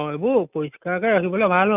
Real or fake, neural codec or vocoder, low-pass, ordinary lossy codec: real; none; 3.6 kHz; none